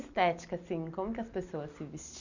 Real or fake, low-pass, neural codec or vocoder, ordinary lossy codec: real; 7.2 kHz; none; none